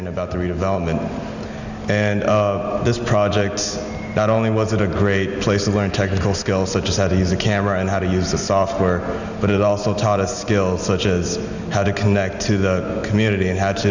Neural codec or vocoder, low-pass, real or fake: none; 7.2 kHz; real